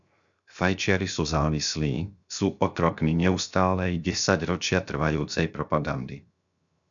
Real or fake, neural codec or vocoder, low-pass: fake; codec, 16 kHz, 0.7 kbps, FocalCodec; 7.2 kHz